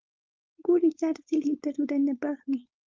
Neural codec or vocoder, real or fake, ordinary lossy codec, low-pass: codec, 16 kHz, 4.8 kbps, FACodec; fake; Opus, 32 kbps; 7.2 kHz